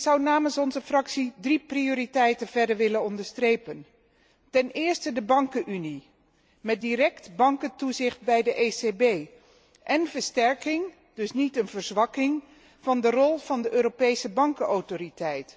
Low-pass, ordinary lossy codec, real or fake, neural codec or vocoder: none; none; real; none